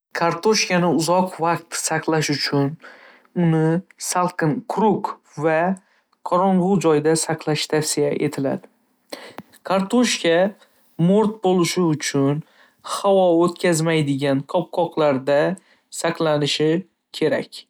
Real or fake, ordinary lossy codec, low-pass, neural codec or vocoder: real; none; none; none